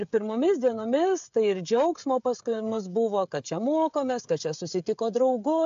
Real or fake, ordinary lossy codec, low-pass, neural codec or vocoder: fake; AAC, 64 kbps; 7.2 kHz; codec, 16 kHz, 16 kbps, FreqCodec, smaller model